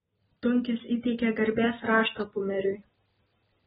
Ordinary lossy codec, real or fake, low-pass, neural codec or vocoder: AAC, 16 kbps; real; 19.8 kHz; none